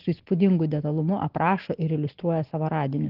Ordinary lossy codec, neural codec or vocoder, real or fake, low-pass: Opus, 16 kbps; none; real; 5.4 kHz